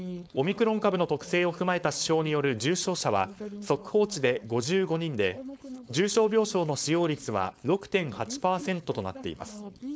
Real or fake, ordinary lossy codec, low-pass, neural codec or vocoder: fake; none; none; codec, 16 kHz, 4.8 kbps, FACodec